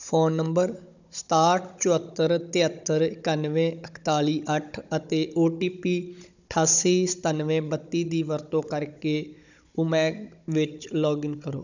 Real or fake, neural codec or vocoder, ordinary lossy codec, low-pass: fake; codec, 16 kHz, 16 kbps, FunCodec, trained on Chinese and English, 50 frames a second; none; 7.2 kHz